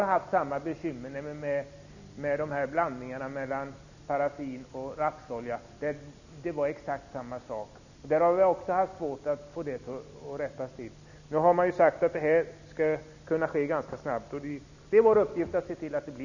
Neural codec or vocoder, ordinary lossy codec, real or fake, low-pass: none; none; real; 7.2 kHz